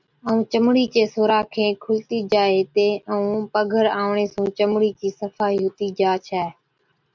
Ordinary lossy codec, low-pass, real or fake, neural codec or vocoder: MP3, 64 kbps; 7.2 kHz; real; none